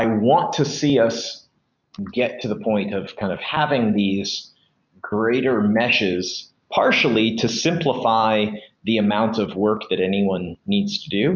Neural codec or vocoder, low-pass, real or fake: none; 7.2 kHz; real